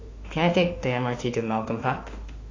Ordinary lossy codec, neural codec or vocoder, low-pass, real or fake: AAC, 32 kbps; autoencoder, 48 kHz, 32 numbers a frame, DAC-VAE, trained on Japanese speech; 7.2 kHz; fake